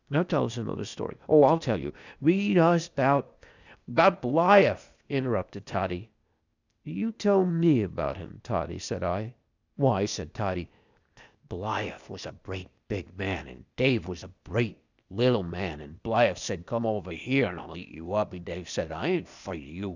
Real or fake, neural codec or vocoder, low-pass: fake; codec, 16 kHz, 0.8 kbps, ZipCodec; 7.2 kHz